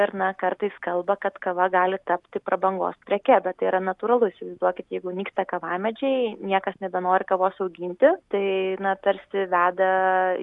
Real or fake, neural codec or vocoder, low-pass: real; none; 10.8 kHz